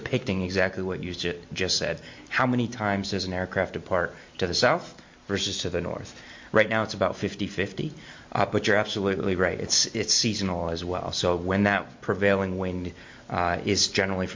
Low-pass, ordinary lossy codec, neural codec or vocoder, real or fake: 7.2 kHz; MP3, 48 kbps; none; real